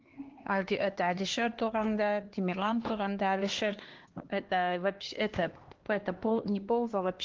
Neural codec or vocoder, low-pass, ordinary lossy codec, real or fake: codec, 16 kHz, 2 kbps, X-Codec, HuBERT features, trained on LibriSpeech; 7.2 kHz; Opus, 16 kbps; fake